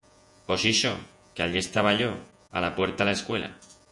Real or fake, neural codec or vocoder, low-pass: fake; vocoder, 48 kHz, 128 mel bands, Vocos; 10.8 kHz